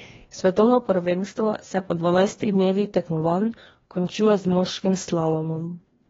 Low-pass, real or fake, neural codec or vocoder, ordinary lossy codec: 7.2 kHz; fake; codec, 16 kHz, 1 kbps, FreqCodec, larger model; AAC, 24 kbps